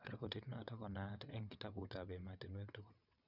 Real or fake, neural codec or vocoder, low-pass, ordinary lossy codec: fake; vocoder, 22.05 kHz, 80 mel bands, WaveNeXt; 5.4 kHz; none